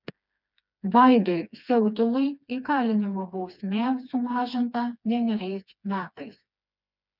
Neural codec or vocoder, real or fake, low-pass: codec, 16 kHz, 2 kbps, FreqCodec, smaller model; fake; 5.4 kHz